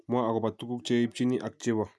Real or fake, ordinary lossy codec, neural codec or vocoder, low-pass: real; none; none; none